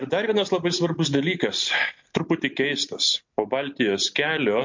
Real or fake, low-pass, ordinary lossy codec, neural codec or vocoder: real; 7.2 kHz; MP3, 48 kbps; none